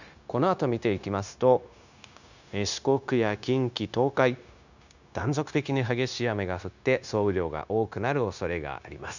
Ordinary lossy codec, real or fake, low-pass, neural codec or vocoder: none; fake; 7.2 kHz; codec, 16 kHz, 0.9 kbps, LongCat-Audio-Codec